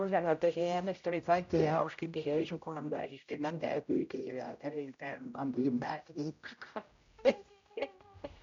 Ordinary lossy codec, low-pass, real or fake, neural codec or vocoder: MP3, 64 kbps; 7.2 kHz; fake; codec, 16 kHz, 0.5 kbps, X-Codec, HuBERT features, trained on general audio